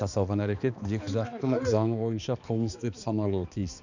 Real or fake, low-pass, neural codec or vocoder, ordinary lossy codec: fake; 7.2 kHz; codec, 16 kHz, 2 kbps, X-Codec, HuBERT features, trained on balanced general audio; none